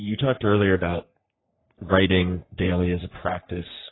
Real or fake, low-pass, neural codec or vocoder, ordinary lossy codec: fake; 7.2 kHz; codec, 44.1 kHz, 3.4 kbps, Pupu-Codec; AAC, 16 kbps